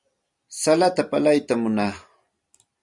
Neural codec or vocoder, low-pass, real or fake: vocoder, 44.1 kHz, 128 mel bands every 256 samples, BigVGAN v2; 10.8 kHz; fake